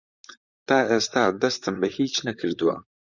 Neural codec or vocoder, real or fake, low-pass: vocoder, 44.1 kHz, 128 mel bands, Pupu-Vocoder; fake; 7.2 kHz